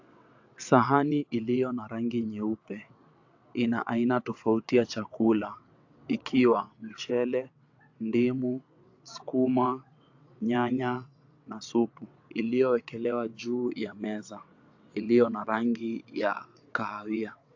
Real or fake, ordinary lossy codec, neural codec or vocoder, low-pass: fake; AAC, 48 kbps; vocoder, 22.05 kHz, 80 mel bands, WaveNeXt; 7.2 kHz